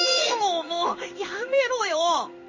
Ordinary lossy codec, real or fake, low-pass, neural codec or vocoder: MP3, 32 kbps; fake; 7.2 kHz; codec, 16 kHz in and 24 kHz out, 1 kbps, XY-Tokenizer